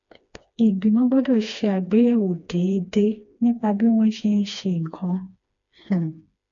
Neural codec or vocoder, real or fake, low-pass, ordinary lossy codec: codec, 16 kHz, 2 kbps, FreqCodec, smaller model; fake; 7.2 kHz; MP3, 64 kbps